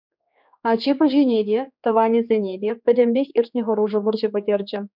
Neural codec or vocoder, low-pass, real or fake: codec, 16 kHz, 4 kbps, X-Codec, HuBERT features, trained on general audio; 5.4 kHz; fake